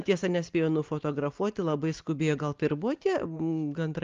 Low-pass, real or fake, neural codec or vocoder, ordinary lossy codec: 7.2 kHz; real; none; Opus, 32 kbps